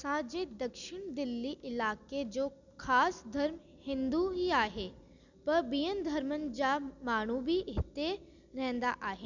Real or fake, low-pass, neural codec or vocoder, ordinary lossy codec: real; 7.2 kHz; none; none